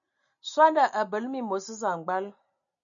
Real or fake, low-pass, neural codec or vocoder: real; 7.2 kHz; none